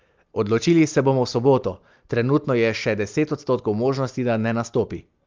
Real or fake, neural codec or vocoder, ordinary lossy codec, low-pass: real; none; Opus, 32 kbps; 7.2 kHz